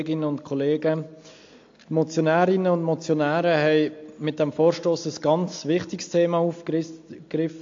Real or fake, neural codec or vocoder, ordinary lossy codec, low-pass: real; none; AAC, 48 kbps; 7.2 kHz